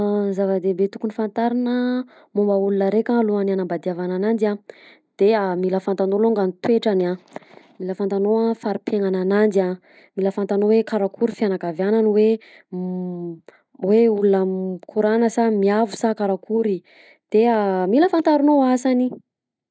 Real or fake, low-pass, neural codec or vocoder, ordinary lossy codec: real; none; none; none